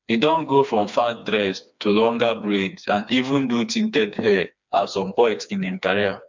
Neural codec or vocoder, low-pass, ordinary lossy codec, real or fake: codec, 16 kHz, 2 kbps, FreqCodec, smaller model; 7.2 kHz; MP3, 64 kbps; fake